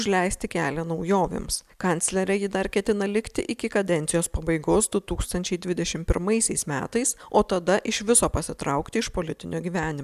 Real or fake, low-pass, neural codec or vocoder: real; 14.4 kHz; none